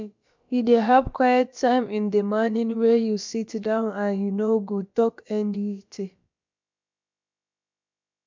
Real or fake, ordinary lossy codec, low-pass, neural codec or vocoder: fake; MP3, 64 kbps; 7.2 kHz; codec, 16 kHz, about 1 kbps, DyCAST, with the encoder's durations